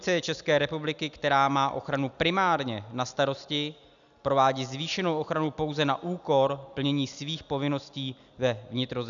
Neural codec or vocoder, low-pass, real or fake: none; 7.2 kHz; real